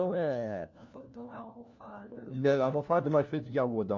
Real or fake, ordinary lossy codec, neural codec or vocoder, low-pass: fake; MP3, 48 kbps; codec, 16 kHz, 1 kbps, FunCodec, trained on LibriTTS, 50 frames a second; 7.2 kHz